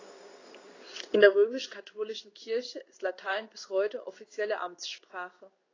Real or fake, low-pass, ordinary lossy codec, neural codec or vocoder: fake; 7.2 kHz; AAC, 32 kbps; codec, 16 kHz in and 24 kHz out, 1 kbps, XY-Tokenizer